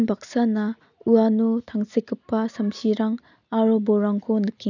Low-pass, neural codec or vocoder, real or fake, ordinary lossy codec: 7.2 kHz; none; real; none